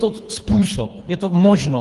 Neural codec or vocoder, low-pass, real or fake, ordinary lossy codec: codec, 24 kHz, 3 kbps, HILCodec; 10.8 kHz; fake; Opus, 24 kbps